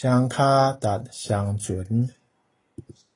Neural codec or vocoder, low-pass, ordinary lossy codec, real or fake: none; 10.8 kHz; AAC, 32 kbps; real